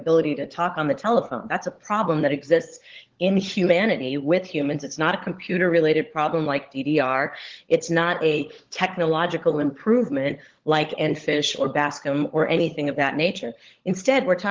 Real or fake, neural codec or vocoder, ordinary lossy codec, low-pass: fake; codec, 16 kHz, 16 kbps, FunCodec, trained on LibriTTS, 50 frames a second; Opus, 16 kbps; 7.2 kHz